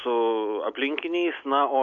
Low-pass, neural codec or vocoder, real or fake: 7.2 kHz; none; real